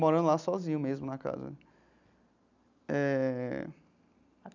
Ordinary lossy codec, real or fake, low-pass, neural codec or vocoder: none; real; 7.2 kHz; none